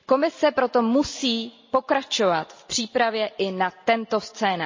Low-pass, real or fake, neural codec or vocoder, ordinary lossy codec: 7.2 kHz; real; none; MP3, 32 kbps